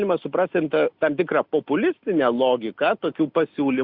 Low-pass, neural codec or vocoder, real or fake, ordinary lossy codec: 5.4 kHz; none; real; Opus, 64 kbps